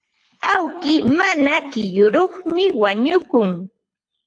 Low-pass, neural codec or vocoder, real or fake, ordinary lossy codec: 9.9 kHz; codec, 24 kHz, 3 kbps, HILCodec; fake; AAC, 48 kbps